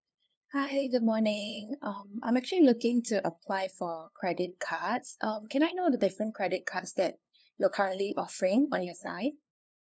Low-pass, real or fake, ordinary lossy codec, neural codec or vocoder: none; fake; none; codec, 16 kHz, 2 kbps, FunCodec, trained on LibriTTS, 25 frames a second